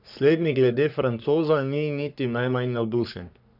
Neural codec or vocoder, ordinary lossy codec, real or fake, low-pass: codec, 44.1 kHz, 3.4 kbps, Pupu-Codec; none; fake; 5.4 kHz